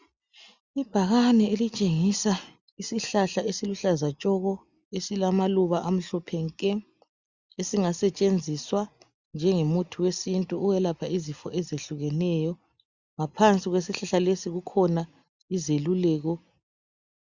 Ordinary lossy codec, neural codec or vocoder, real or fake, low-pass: Opus, 64 kbps; none; real; 7.2 kHz